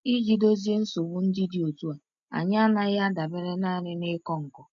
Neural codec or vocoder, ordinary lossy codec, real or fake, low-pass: none; MP3, 48 kbps; real; 7.2 kHz